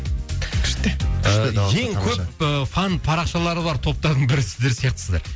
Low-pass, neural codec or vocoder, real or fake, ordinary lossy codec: none; none; real; none